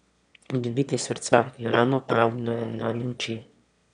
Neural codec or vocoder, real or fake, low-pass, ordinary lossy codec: autoencoder, 22.05 kHz, a latent of 192 numbers a frame, VITS, trained on one speaker; fake; 9.9 kHz; none